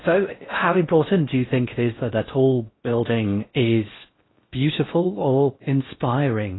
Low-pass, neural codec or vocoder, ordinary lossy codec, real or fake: 7.2 kHz; codec, 16 kHz in and 24 kHz out, 0.6 kbps, FocalCodec, streaming, 4096 codes; AAC, 16 kbps; fake